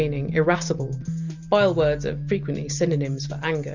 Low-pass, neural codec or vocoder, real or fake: 7.2 kHz; none; real